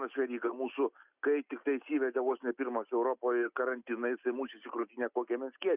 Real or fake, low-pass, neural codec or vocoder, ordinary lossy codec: real; 3.6 kHz; none; Opus, 64 kbps